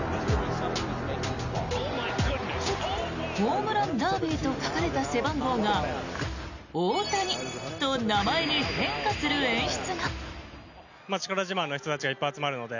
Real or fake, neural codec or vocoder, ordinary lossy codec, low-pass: real; none; none; 7.2 kHz